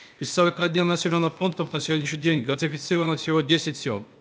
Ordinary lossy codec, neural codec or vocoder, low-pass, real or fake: none; codec, 16 kHz, 0.8 kbps, ZipCodec; none; fake